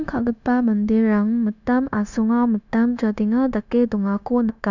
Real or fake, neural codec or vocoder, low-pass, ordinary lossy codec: fake; codec, 16 kHz, 0.9 kbps, LongCat-Audio-Codec; 7.2 kHz; none